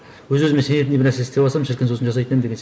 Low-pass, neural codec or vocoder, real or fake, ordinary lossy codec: none; none; real; none